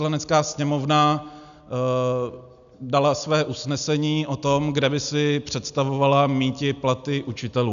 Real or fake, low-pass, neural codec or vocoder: real; 7.2 kHz; none